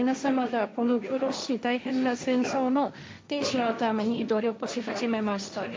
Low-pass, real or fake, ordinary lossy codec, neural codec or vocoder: none; fake; none; codec, 16 kHz, 1.1 kbps, Voila-Tokenizer